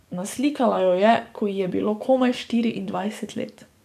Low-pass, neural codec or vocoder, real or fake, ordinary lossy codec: 14.4 kHz; codec, 44.1 kHz, 7.8 kbps, DAC; fake; none